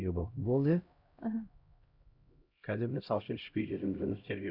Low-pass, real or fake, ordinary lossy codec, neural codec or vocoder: 5.4 kHz; fake; Opus, 64 kbps; codec, 16 kHz, 0.5 kbps, X-Codec, HuBERT features, trained on LibriSpeech